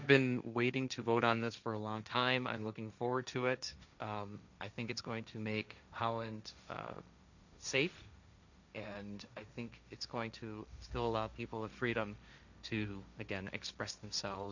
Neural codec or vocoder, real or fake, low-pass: codec, 16 kHz, 1.1 kbps, Voila-Tokenizer; fake; 7.2 kHz